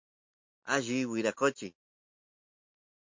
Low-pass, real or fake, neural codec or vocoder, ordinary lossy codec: 7.2 kHz; real; none; MP3, 48 kbps